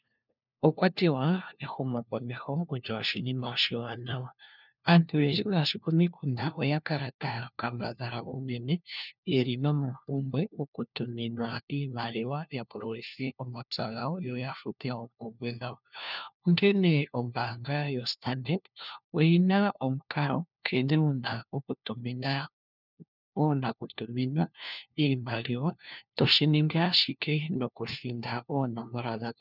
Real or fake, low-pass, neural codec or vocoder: fake; 5.4 kHz; codec, 16 kHz, 1 kbps, FunCodec, trained on LibriTTS, 50 frames a second